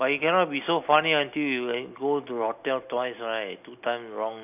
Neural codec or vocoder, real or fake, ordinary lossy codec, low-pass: none; real; none; 3.6 kHz